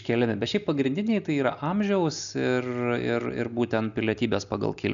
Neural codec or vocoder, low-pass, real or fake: none; 7.2 kHz; real